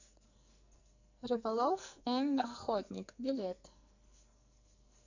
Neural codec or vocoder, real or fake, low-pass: codec, 44.1 kHz, 2.6 kbps, SNAC; fake; 7.2 kHz